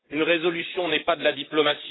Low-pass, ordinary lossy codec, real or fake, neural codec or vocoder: 7.2 kHz; AAC, 16 kbps; fake; vocoder, 44.1 kHz, 128 mel bands, Pupu-Vocoder